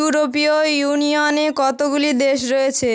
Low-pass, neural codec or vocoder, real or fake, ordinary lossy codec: none; none; real; none